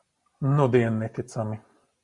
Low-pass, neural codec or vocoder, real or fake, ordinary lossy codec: 10.8 kHz; none; real; Opus, 64 kbps